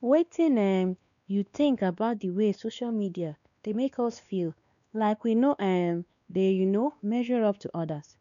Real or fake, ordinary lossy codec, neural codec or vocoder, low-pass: fake; none; codec, 16 kHz, 2 kbps, X-Codec, WavLM features, trained on Multilingual LibriSpeech; 7.2 kHz